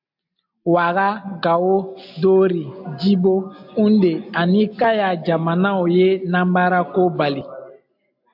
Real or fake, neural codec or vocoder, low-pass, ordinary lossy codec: real; none; 5.4 kHz; AAC, 48 kbps